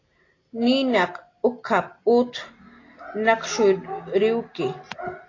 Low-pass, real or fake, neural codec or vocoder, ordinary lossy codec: 7.2 kHz; real; none; AAC, 32 kbps